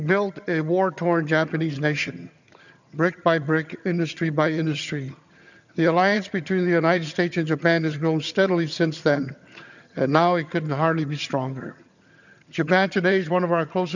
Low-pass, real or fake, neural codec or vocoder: 7.2 kHz; fake; vocoder, 22.05 kHz, 80 mel bands, HiFi-GAN